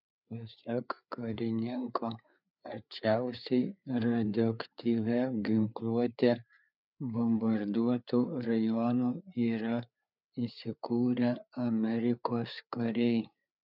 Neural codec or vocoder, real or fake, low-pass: codec, 16 kHz, 4 kbps, FreqCodec, larger model; fake; 5.4 kHz